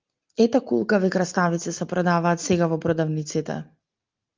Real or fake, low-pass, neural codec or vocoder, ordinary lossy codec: real; 7.2 kHz; none; Opus, 32 kbps